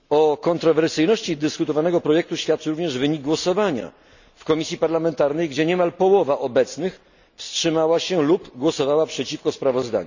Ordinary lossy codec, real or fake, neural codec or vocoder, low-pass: none; real; none; 7.2 kHz